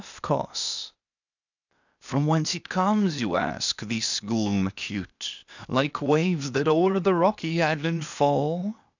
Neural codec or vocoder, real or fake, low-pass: codec, 16 kHz, 0.8 kbps, ZipCodec; fake; 7.2 kHz